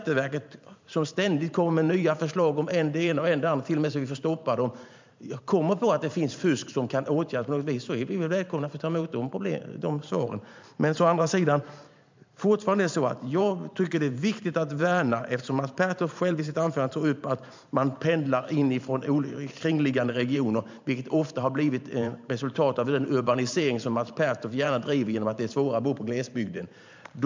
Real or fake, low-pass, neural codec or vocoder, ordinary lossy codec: fake; 7.2 kHz; vocoder, 44.1 kHz, 128 mel bands every 512 samples, BigVGAN v2; MP3, 64 kbps